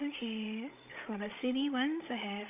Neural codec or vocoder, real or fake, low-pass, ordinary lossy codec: codec, 16 kHz, 16 kbps, FreqCodec, larger model; fake; 3.6 kHz; none